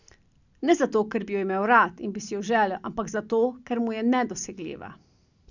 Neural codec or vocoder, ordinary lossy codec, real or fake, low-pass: none; none; real; 7.2 kHz